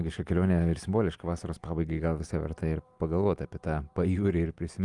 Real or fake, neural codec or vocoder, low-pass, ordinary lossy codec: real; none; 10.8 kHz; Opus, 32 kbps